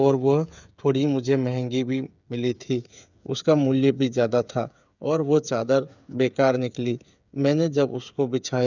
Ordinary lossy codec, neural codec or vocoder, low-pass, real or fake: none; codec, 16 kHz, 8 kbps, FreqCodec, smaller model; 7.2 kHz; fake